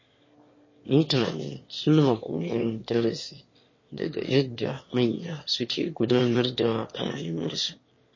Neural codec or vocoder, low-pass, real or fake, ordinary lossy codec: autoencoder, 22.05 kHz, a latent of 192 numbers a frame, VITS, trained on one speaker; 7.2 kHz; fake; MP3, 32 kbps